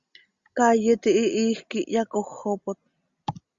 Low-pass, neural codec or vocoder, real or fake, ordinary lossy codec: 7.2 kHz; none; real; Opus, 64 kbps